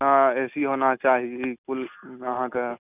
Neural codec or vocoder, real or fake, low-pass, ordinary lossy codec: none; real; 3.6 kHz; none